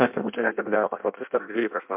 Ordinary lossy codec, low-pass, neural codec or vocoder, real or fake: MP3, 32 kbps; 3.6 kHz; codec, 16 kHz in and 24 kHz out, 0.6 kbps, FireRedTTS-2 codec; fake